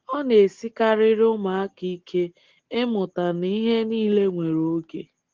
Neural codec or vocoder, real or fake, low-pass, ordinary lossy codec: none; real; 7.2 kHz; Opus, 16 kbps